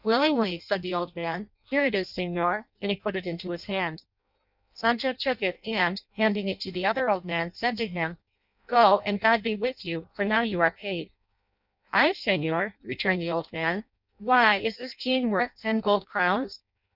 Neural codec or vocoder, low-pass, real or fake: codec, 16 kHz in and 24 kHz out, 0.6 kbps, FireRedTTS-2 codec; 5.4 kHz; fake